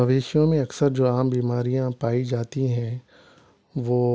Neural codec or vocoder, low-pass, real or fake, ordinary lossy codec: none; none; real; none